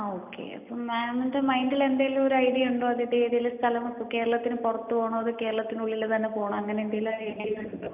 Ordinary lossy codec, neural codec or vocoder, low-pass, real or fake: none; none; 3.6 kHz; real